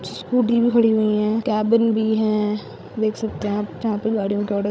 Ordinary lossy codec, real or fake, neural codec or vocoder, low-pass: none; fake; codec, 16 kHz, 16 kbps, FreqCodec, larger model; none